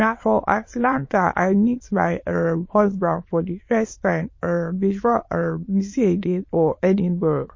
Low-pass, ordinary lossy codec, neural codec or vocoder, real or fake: 7.2 kHz; MP3, 32 kbps; autoencoder, 22.05 kHz, a latent of 192 numbers a frame, VITS, trained on many speakers; fake